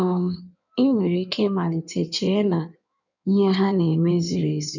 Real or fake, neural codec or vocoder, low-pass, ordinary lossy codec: fake; codec, 16 kHz in and 24 kHz out, 1.1 kbps, FireRedTTS-2 codec; 7.2 kHz; none